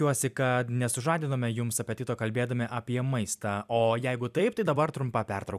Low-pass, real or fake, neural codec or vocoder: 14.4 kHz; fake; vocoder, 44.1 kHz, 128 mel bands every 256 samples, BigVGAN v2